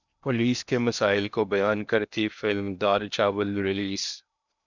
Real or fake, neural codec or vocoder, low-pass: fake; codec, 16 kHz in and 24 kHz out, 0.8 kbps, FocalCodec, streaming, 65536 codes; 7.2 kHz